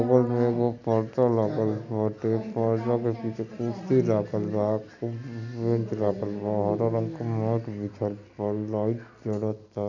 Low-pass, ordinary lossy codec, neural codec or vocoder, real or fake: 7.2 kHz; none; none; real